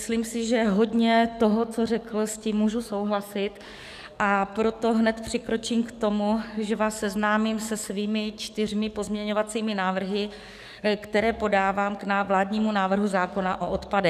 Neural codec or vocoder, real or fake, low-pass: codec, 44.1 kHz, 7.8 kbps, DAC; fake; 14.4 kHz